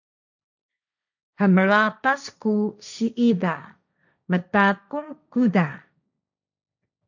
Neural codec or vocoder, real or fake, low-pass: codec, 16 kHz, 1.1 kbps, Voila-Tokenizer; fake; 7.2 kHz